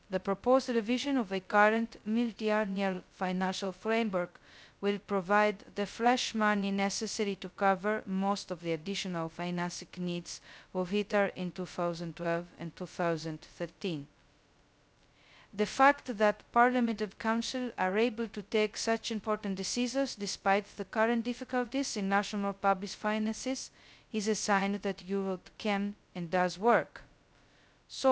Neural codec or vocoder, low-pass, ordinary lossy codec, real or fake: codec, 16 kHz, 0.2 kbps, FocalCodec; none; none; fake